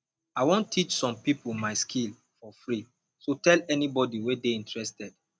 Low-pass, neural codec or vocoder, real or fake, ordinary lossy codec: none; none; real; none